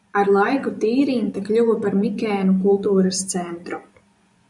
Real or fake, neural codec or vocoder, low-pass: fake; vocoder, 24 kHz, 100 mel bands, Vocos; 10.8 kHz